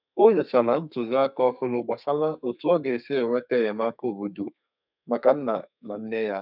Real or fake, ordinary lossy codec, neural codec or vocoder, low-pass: fake; none; codec, 32 kHz, 1.9 kbps, SNAC; 5.4 kHz